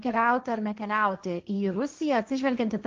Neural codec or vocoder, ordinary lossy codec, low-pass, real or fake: codec, 16 kHz, 1.1 kbps, Voila-Tokenizer; Opus, 32 kbps; 7.2 kHz; fake